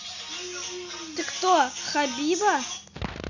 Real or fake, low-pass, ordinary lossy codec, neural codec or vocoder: real; 7.2 kHz; none; none